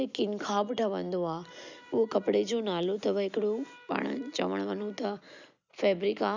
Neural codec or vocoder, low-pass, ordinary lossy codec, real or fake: none; 7.2 kHz; none; real